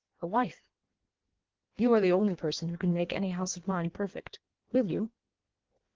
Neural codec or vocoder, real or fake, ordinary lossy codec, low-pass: codec, 16 kHz in and 24 kHz out, 1.1 kbps, FireRedTTS-2 codec; fake; Opus, 16 kbps; 7.2 kHz